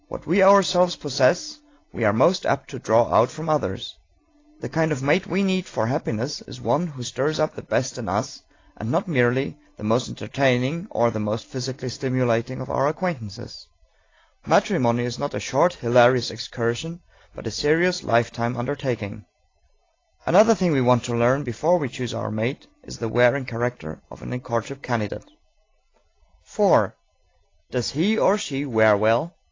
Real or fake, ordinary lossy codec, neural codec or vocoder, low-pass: real; AAC, 32 kbps; none; 7.2 kHz